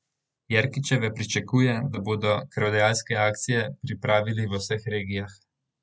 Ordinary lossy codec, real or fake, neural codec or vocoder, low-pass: none; real; none; none